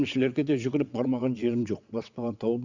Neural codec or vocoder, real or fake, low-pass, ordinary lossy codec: vocoder, 22.05 kHz, 80 mel bands, WaveNeXt; fake; 7.2 kHz; Opus, 64 kbps